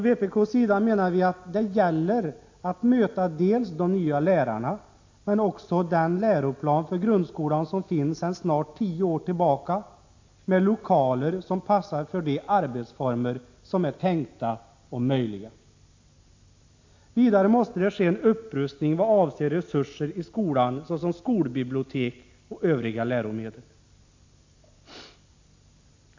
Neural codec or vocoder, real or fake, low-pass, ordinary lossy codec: none; real; 7.2 kHz; AAC, 48 kbps